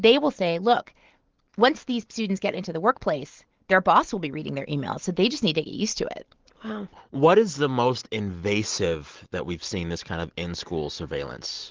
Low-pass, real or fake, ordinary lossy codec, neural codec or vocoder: 7.2 kHz; real; Opus, 16 kbps; none